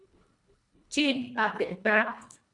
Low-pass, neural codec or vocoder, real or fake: 10.8 kHz; codec, 24 kHz, 1.5 kbps, HILCodec; fake